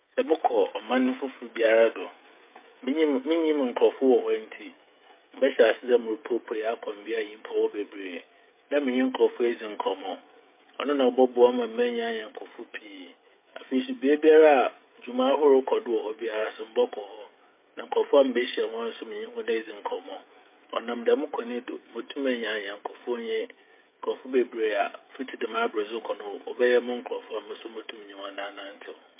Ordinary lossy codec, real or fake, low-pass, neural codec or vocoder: MP3, 24 kbps; fake; 3.6 kHz; codec, 16 kHz, 16 kbps, FreqCodec, smaller model